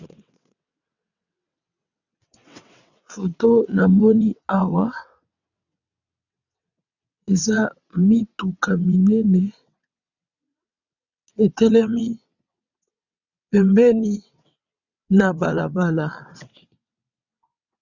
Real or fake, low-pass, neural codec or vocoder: fake; 7.2 kHz; vocoder, 22.05 kHz, 80 mel bands, WaveNeXt